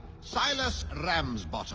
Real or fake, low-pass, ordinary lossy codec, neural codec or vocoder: real; 7.2 kHz; Opus, 24 kbps; none